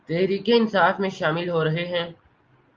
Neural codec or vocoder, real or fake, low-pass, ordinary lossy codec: none; real; 7.2 kHz; Opus, 24 kbps